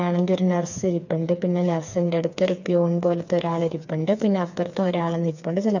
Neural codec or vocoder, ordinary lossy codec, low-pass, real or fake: codec, 16 kHz, 4 kbps, FreqCodec, smaller model; none; 7.2 kHz; fake